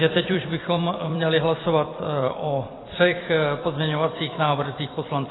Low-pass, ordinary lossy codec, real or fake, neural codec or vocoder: 7.2 kHz; AAC, 16 kbps; fake; vocoder, 44.1 kHz, 128 mel bands every 256 samples, BigVGAN v2